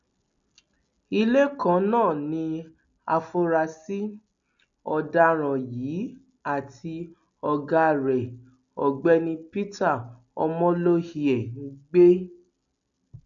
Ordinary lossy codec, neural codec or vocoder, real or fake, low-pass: none; none; real; 7.2 kHz